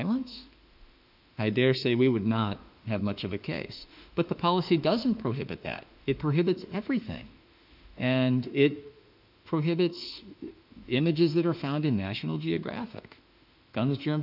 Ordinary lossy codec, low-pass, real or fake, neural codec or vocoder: AAC, 48 kbps; 5.4 kHz; fake; autoencoder, 48 kHz, 32 numbers a frame, DAC-VAE, trained on Japanese speech